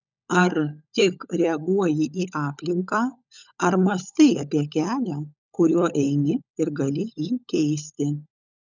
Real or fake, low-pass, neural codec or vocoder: fake; 7.2 kHz; codec, 16 kHz, 16 kbps, FunCodec, trained on LibriTTS, 50 frames a second